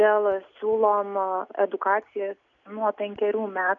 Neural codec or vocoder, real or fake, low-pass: none; real; 7.2 kHz